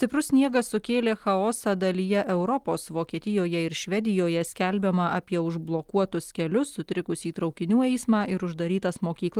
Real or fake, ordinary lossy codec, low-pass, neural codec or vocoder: real; Opus, 24 kbps; 19.8 kHz; none